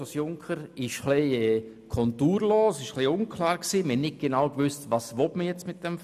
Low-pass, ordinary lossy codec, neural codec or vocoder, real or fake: 14.4 kHz; none; none; real